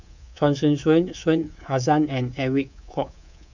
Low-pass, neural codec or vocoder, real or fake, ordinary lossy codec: 7.2 kHz; codec, 24 kHz, 3.1 kbps, DualCodec; fake; none